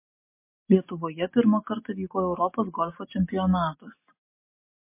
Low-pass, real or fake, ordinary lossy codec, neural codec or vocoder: 3.6 kHz; real; MP3, 24 kbps; none